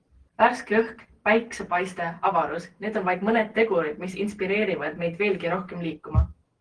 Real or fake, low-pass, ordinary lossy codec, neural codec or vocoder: real; 9.9 kHz; Opus, 16 kbps; none